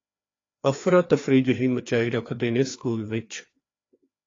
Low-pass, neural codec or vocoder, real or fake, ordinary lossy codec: 7.2 kHz; codec, 16 kHz, 2 kbps, FreqCodec, larger model; fake; AAC, 32 kbps